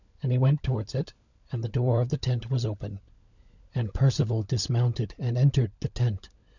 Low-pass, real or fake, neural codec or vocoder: 7.2 kHz; fake; codec, 16 kHz, 16 kbps, FunCodec, trained on LibriTTS, 50 frames a second